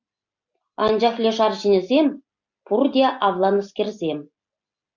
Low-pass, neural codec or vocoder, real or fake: 7.2 kHz; none; real